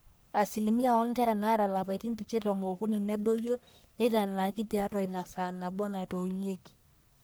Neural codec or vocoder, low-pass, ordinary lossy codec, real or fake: codec, 44.1 kHz, 1.7 kbps, Pupu-Codec; none; none; fake